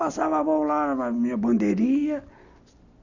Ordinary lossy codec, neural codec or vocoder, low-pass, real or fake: none; none; 7.2 kHz; real